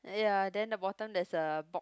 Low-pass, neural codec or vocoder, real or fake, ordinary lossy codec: none; none; real; none